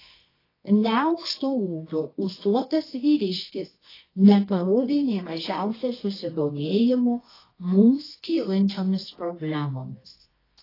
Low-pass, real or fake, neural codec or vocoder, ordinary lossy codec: 5.4 kHz; fake; codec, 24 kHz, 0.9 kbps, WavTokenizer, medium music audio release; AAC, 24 kbps